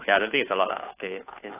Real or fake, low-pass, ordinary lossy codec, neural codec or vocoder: fake; 3.6 kHz; AAC, 24 kbps; codec, 16 kHz, 2 kbps, FunCodec, trained on Chinese and English, 25 frames a second